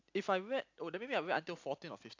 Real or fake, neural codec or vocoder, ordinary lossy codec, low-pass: real; none; AAC, 48 kbps; 7.2 kHz